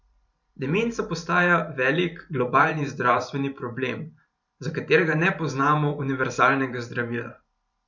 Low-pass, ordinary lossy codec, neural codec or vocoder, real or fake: 7.2 kHz; none; vocoder, 44.1 kHz, 128 mel bands every 512 samples, BigVGAN v2; fake